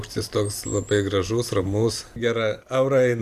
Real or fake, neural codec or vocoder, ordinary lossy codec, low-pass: fake; vocoder, 44.1 kHz, 128 mel bands every 512 samples, BigVGAN v2; Opus, 64 kbps; 14.4 kHz